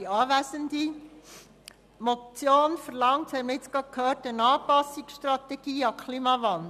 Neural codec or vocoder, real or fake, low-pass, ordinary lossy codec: none; real; 14.4 kHz; none